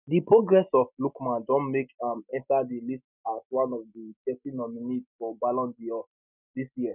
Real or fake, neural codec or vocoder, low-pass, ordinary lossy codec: real; none; 3.6 kHz; none